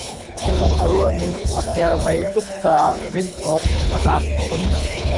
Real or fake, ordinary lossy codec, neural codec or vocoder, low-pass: fake; MP3, 96 kbps; codec, 24 kHz, 3 kbps, HILCodec; 10.8 kHz